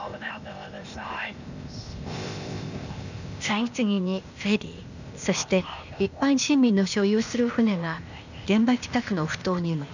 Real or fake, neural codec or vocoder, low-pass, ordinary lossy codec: fake; codec, 16 kHz, 0.8 kbps, ZipCodec; 7.2 kHz; none